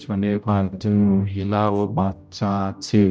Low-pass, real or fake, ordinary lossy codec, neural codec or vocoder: none; fake; none; codec, 16 kHz, 0.5 kbps, X-Codec, HuBERT features, trained on general audio